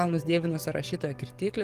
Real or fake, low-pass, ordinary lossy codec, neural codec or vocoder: fake; 14.4 kHz; Opus, 24 kbps; codec, 44.1 kHz, 7.8 kbps, DAC